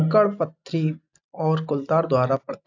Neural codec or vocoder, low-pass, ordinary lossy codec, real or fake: none; 7.2 kHz; AAC, 48 kbps; real